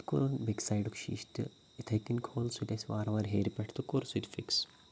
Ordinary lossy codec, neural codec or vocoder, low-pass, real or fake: none; none; none; real